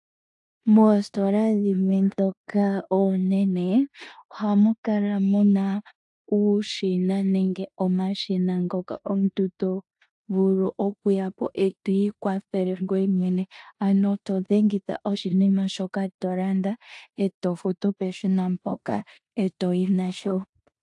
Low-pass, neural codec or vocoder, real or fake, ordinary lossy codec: 10.8 kHz; codec, 16 kHz in and 24 kHz out, 0.9 kbps, LongCat-Audio-Codec, four codebook decoder; fake; AAC, 64 kbps